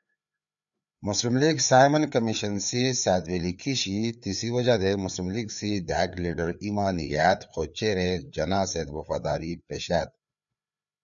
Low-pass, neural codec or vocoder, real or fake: 7.2 kHz; codec, 16 kHz, 4 kbps, FreqCodec, larger model; fake